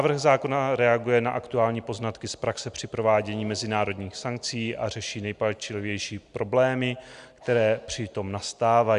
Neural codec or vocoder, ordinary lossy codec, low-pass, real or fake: none; Opus, 64 kbps; 10.8 kHz; real